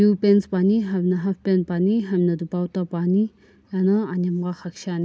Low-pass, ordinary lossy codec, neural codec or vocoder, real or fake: none; none; none; real